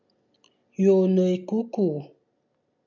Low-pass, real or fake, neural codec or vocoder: 7.2 kHz; real; none